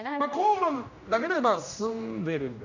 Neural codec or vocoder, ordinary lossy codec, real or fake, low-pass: codec, 16 kHz, 1 kbps, X-Codec, HuBERT features, trained on general audio; none; fake; 7.2 kHz